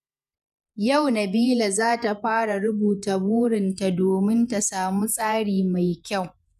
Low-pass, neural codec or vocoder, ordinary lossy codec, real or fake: 14.4 kHz; vocoder, 48 kHz, 128 mel bands, Vocos; none; fake